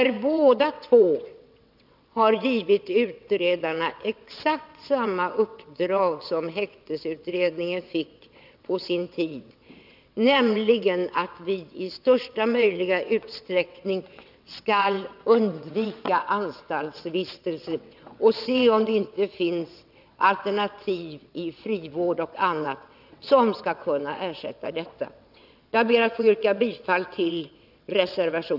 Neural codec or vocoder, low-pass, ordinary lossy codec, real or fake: vocoder, 22.05 kHz, 80 mel bands, WaveNeXt; 5.4 kHz; none; fake